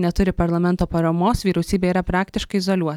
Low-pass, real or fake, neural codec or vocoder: 19.8 kHz; real; none